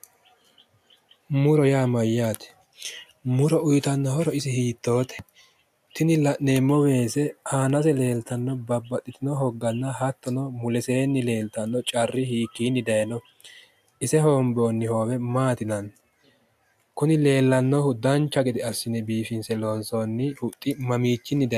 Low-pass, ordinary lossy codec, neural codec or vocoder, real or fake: 14.4 kHz; AAC, 96 kbps; none; real